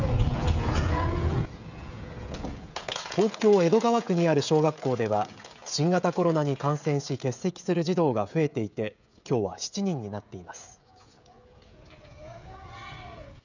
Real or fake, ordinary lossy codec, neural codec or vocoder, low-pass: fake; none; codec, 16 kHz, 16 kbps, FreqCodec, smaller model; 7.2 kHz